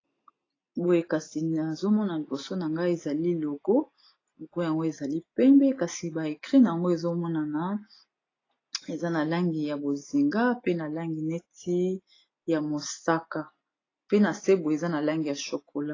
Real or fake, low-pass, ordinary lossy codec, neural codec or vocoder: real; 7.2 kHz; AAC, 32 kbps; none